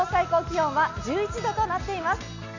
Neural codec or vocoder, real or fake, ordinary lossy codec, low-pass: none; real; AAC, 48 kbps; 7.2 kHz